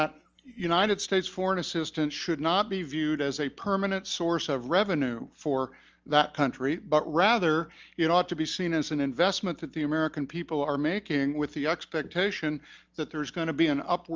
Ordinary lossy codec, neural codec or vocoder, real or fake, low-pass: Opus, 24 kbps; none; real; 7.2 kHz